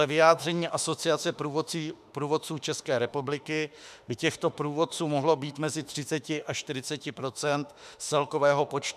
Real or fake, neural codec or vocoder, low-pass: fake; autoencoder, 48 kHz, 32 numbers a frame, DAC-VAE, trained on Japanese speech; 14.4 kHz